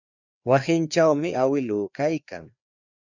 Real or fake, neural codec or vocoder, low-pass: fake; codec, 16 kHz, 2 kbps, FreqCodec, larger model; 7.2 kHz